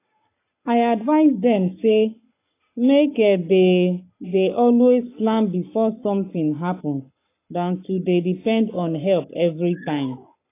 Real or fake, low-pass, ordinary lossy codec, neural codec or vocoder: fake; 3.6 kHz; AAC, 24 kbps; codec, 44.1 kHz, 7.8 kbps, Pupu-Codec